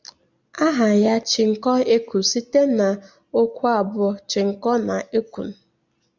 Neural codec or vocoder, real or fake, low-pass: none; real; 7.2 kHz